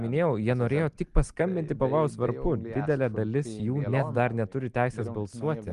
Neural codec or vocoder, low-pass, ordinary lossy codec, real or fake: none; 10.8 kHz; Opus, 24 kbps; real